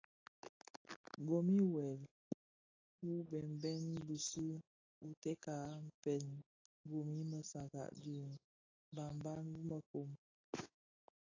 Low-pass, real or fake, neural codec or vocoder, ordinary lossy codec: 7.2 kHz; real; none; AAC, 32 kbps